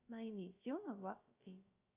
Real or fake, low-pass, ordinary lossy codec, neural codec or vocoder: fake; 3.6 kHz; Opus, 64 kbps; codec, 16 kHz, 0.3 kbps, FocalCodec